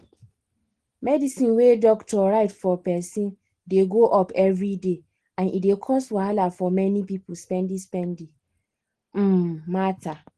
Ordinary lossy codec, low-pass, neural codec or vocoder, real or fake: Opus, 16 kbps; 10.8 kHz; none; real